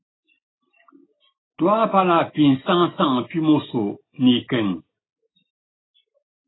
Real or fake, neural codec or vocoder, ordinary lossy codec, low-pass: real; none; AAC, 16 kbps; 7.2 kHz